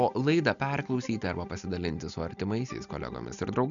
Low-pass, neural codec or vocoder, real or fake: 7.2 kHz; none; real